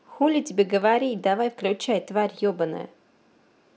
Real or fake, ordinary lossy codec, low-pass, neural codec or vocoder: real; none; none; none